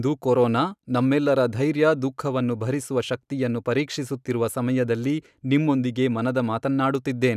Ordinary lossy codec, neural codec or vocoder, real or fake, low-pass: none; none; real; 14.4 kHz